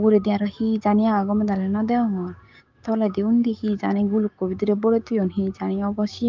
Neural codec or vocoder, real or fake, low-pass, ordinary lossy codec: codec, 16 kHz, 6 kbps, DAC; fake; 7.2 kHz; Opus, 24 kbps